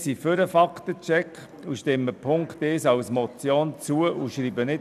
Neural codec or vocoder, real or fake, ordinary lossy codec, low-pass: none; real; none; 14.4 kHz